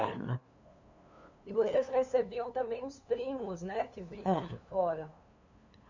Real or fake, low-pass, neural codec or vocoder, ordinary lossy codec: fake; 7.2 kHz; codec, 16 kHz, 2 kbps, FunCodec, trained on LibriTTS, 25 frames a second; none